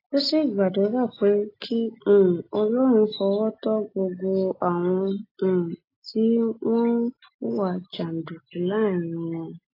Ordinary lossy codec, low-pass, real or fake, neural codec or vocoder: AAC, 24 kbps; 5.4 kHz; real; none